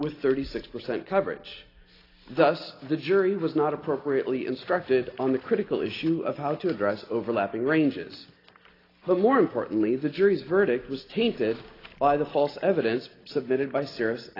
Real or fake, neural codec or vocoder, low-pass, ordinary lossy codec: real; none; 5.4 kHz; AAC, 24 kbps